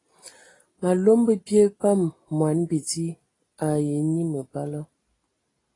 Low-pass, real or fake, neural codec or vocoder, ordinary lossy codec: 10.8 kHz; real; none; AAC, 32 kbps